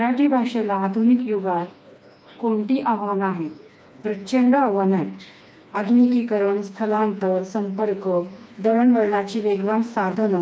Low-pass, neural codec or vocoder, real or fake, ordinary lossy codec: none; codec, 16 kHz, 2 kbps, FreqCodec, smaller model; fake; none